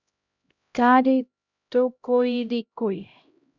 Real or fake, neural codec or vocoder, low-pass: fake; codec, 16 kHz, 0.5 kbps, X-Codec, HuBERT features, trained on LibriSpeech; 7.2 kHz